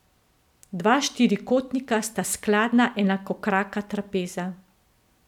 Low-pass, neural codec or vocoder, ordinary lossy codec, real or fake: 19.8 kHz; none; none; real